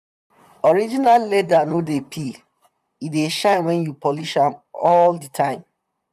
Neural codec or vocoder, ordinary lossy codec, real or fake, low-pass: vocoder, 44.1 kHz, 128 mel bands, Pupu-Vocoder; none; fake; 14.4 kHz